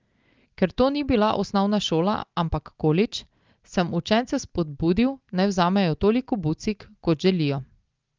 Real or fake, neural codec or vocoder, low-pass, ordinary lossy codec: real; none; 7.2 kHz; Opus, 32 kbps